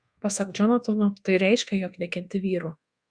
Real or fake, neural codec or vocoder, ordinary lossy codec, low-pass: fake; codec, 24 kHz, 1.2 kbps, DualCodec; Opus, 64 kbps; 9.9 kHz